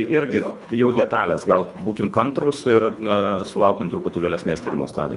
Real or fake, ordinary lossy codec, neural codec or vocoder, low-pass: fake; Opus, 64 kbps; codec, 24 kHz, 1.5 kbps, HILCodec; 10.8 kHz